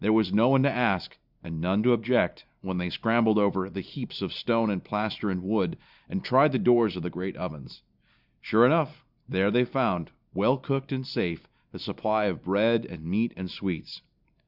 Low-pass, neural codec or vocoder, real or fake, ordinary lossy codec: 5.4 kHz; none; real; Opus, 64 kbps